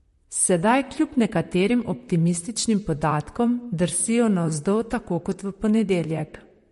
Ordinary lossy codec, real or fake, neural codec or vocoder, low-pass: MP3, 48 kbps; fake; vocoder, 44.1 kHz, 128 mel bands, Pupu-Vocoder; 14.4 kHz